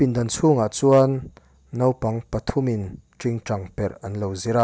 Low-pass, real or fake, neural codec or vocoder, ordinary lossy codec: none; real; none; none